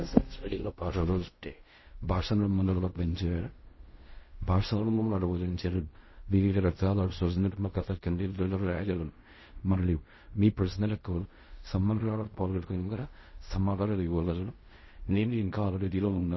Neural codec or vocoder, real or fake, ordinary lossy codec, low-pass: codec, 16 kHz in and 24 kHz out, 0.4 kbps, LongCat-Audio-Codec, fine tuned four codebook decoder; fake; MP3, 24 kbps; 7.2 kHz